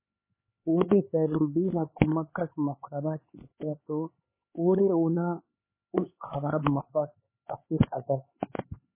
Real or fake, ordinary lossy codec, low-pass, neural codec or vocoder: fake; MP3, 16 kbps; 3.6 kHz; codec, 16 kHz, 4 kbps, X-Codec, HuBERT features, trained on LibriSpeech